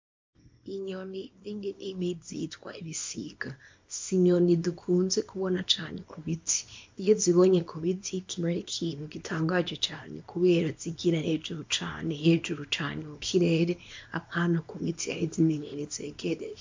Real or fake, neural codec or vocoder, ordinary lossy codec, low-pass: fake; codec, 24 kHz, 0.9 kbps, WavTokenizer, small release; MP3, 48 kbps; 7.2 kHz